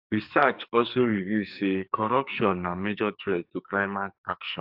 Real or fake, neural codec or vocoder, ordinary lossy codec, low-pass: fake; codec, 44.1 kHz, 2.6 kbps, SNAC; AAC, 48 kbps; 5.4 kHz